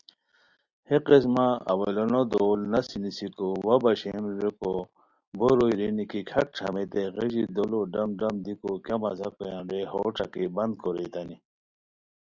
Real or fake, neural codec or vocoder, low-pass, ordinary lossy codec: real; none; 7.2 kHz; Opus, 64 kbps